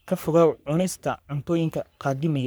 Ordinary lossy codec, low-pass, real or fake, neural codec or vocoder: none; none; fake; codec, 44.1 kHz, 3.4 kbps, Pupu-Codec